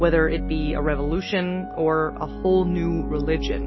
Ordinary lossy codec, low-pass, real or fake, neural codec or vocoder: MP3, 24 kbps; 7.2 kHz; real; none